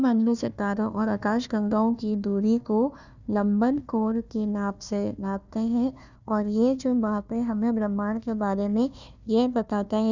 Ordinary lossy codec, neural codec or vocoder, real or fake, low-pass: none; codec, 16 kHz, 1 kbps, FunCodec, trained on Chinese and English, 50 frames a second; fake; 7.2 kHz